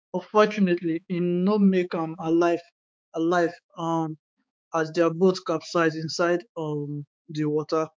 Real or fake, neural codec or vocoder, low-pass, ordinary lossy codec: fake; codec, 16 kHz, 4 kbps, X-Codec, HuBERT features, trained on balanced general audio; none; none